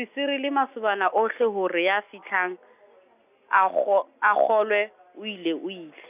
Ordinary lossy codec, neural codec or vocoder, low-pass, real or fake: none; none; 3.6 kHz; real